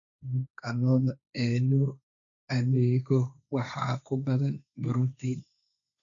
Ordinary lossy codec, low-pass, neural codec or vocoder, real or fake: none; 7.2 kHz; codec, 16 kHz, 1.1 kbps, Voila-Tokenizer; fake